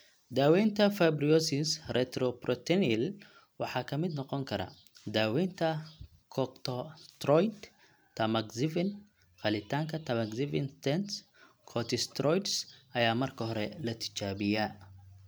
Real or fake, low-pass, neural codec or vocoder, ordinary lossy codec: real; none; none; none